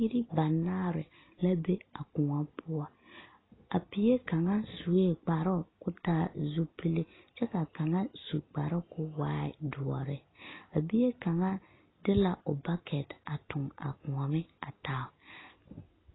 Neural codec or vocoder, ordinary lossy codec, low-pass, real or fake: none; AAC, 16 kbps; 7.2 kHz; real